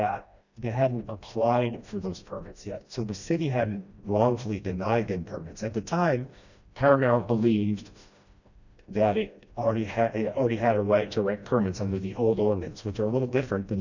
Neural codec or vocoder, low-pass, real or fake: codec, 16 kHz, 1 kbps, FreqCodec, smaller model; 7.2 kHz; fake